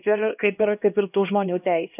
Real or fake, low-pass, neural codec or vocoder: fake; 3.6 kHz; codec, 16 kHz, 1 kbps, X-Codec, HuBERT features, trained on LibriSpeech